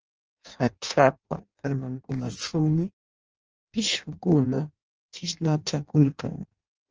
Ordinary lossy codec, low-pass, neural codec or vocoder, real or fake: Opus, 24 kbps; 7.2 kHz; codec, 16 kHz in and 24 kHz out, 0.6 kbps, FireRedTTS-2 codec; fake